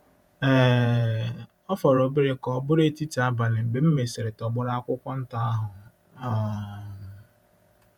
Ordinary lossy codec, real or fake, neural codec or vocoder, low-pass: none; fake; vocoder, 48 kHz, 128 mel bands, Vocos; 19.8 kHz